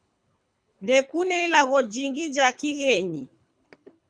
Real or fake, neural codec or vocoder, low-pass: fake; codec, 24 kHz, 3 kbps, HILCodec; 9.9 kHz